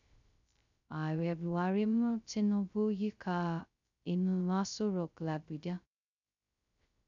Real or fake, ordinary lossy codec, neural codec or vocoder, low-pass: fake; none; codec, 16 kHz, 0.2 kbps, FocalCodec; 7.2 kHz